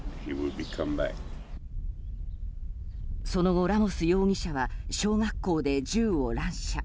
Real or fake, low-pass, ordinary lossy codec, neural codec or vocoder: real; none; none; none